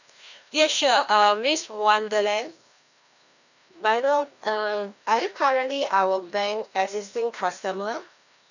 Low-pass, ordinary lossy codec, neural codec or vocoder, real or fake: 7.2 kHz; none; codec, 16 kHz, 1 kbps, FreqCodec, larger model; fake